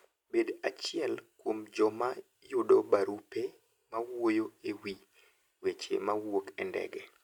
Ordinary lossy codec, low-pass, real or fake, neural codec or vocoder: none; 19.8 kHz; real; none